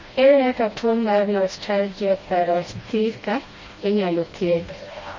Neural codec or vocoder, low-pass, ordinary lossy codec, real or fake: codec, 16 kHz, 1 kbps, FreqCodec, smaller model; 7.2 kHz; MP3, 32 kbps; fake